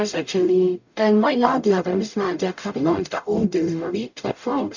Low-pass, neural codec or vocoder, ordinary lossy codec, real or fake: 7.2 kHz; codec, 44.1 kHz, 0.9 kbps, DAC; none; fake